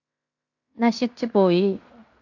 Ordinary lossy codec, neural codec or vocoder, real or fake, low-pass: AAC, 48 kbps; codec, 16 kHz in and 24 kHz out, 0.9 kbps, LongCat-Audio-Codec, fine tuned four codebook decoder; fake; 7.2 kHz